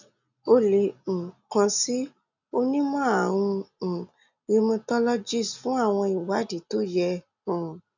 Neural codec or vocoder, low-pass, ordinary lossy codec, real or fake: none; 7.2 kHz; none; real